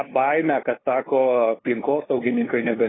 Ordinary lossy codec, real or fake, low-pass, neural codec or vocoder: AAC, 16 kbps; fake; 7.2 kHz; codec, 16 kHz, 2 kbps, FunCodec, trained on LibriTTS, 25 frames a second